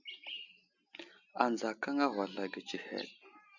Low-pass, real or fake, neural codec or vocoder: 7.2 kHz; real; none